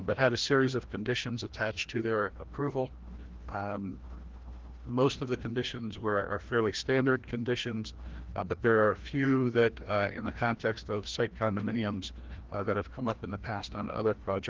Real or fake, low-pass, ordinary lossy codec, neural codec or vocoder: fake; 7.2 kHz; Opus, 16 kbps; codec, 16 kHz, 1 kbps, FreqCodec, larger model